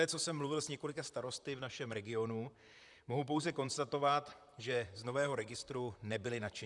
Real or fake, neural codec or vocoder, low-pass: fake; vocoder, 44.1 kHz, 128 mel bands, Pupu-Vocoder; 10.8 kHz